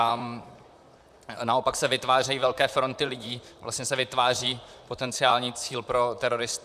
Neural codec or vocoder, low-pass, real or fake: vocoder, 44.1 kHz, 128 mel bands, Pupu-Vocoder; 14.4 kHz; fake